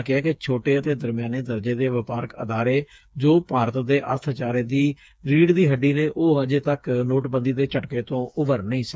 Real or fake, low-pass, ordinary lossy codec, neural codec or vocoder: fake; none; none; codec, 16 kHz, 4 kbps, FreqCodec, smaller model